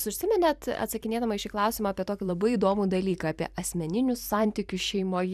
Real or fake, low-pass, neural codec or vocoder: fake; 14.4 kHz; vocoder, 44.1 kHz, 128 mel bands every 512 samples, BigVGAN v2